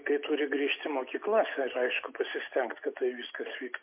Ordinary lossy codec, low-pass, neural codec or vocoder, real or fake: MP3, 32 kbps; 3.6 kHz; none; real